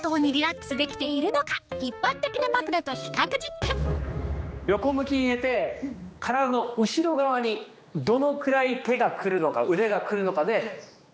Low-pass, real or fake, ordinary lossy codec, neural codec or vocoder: none; fake; none; codec, 16 kHz, 2 kbps, X-Codec, HuBERT features, trained on general audio